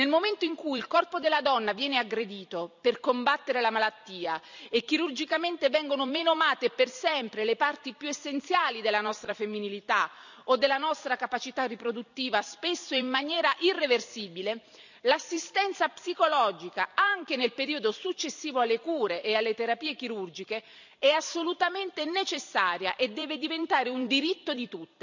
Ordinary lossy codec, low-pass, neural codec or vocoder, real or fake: none; 7.2 kHz; vocoder, 44.1 kHz, 128 mel bands every 256 samples, BigVGAN v2; fake